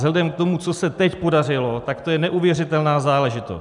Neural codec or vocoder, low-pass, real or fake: none; 10.8 kHz; real